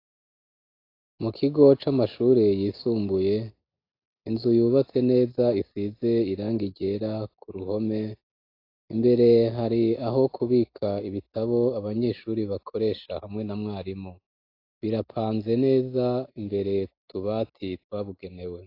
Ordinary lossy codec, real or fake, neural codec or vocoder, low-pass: AAC, 32 kbps; real; none; 5.4 kHz